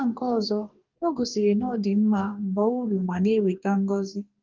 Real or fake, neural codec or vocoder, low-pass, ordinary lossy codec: fake; codec, 44.1 kHz, 2.6 kbps, DAC; 7.2 kHz; Opus, 32 kbps